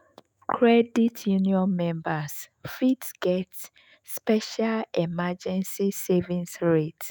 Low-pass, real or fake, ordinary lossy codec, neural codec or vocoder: none; fake; none; autoencoder, 48 kHz, 128 numbers a frame, DAC-VAE, trained on Japanese speech